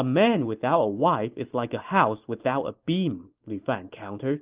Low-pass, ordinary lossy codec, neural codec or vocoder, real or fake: 3.6 kHz; Opus, 24 kbps; none; real